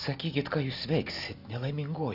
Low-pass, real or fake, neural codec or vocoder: 5.4 kHz; real; none